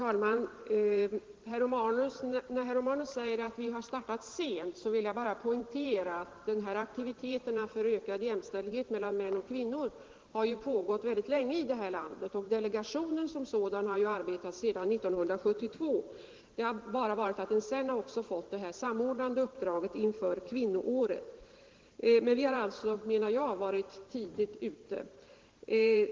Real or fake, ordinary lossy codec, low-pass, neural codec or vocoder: fake; Opus, 16 kbps; 7.2 kHz; vocoder, 44.1 kHz, 128 mel bands every 512 samples, BigVGAN v2